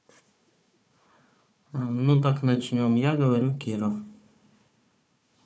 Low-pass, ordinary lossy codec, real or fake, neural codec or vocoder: none; none; fake; codec, 16 kHz, 4 kbps, FunCodec, trained on Chinese and English, 50 frames a second